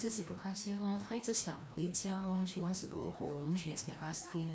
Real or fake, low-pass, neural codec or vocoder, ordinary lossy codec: fake; none; codec, 16 kHz, 1 kbps, FreqCodec, larger model; none